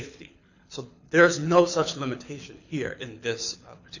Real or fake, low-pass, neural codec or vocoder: fake; 7.2 kHz; codec, 24 kHz, 6 kbps, HILCodec